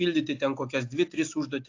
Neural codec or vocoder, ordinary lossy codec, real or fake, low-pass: none; AAC, 48 kbps; real; 7.2 kHz